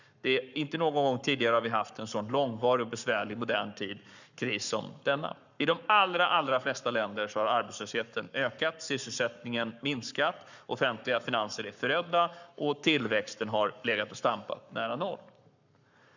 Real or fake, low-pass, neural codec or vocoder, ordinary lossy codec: fake; 7.2 kHz; codec, 44.1 kHz, 7.8 kbps, Pupu-Codec; none